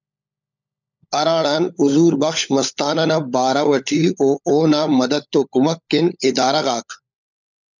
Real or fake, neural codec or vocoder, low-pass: fake; codec, 16 kHz, 16 kbps, FunCodec, trained on LibriTTS, 50 frames a second; 7.2 kHz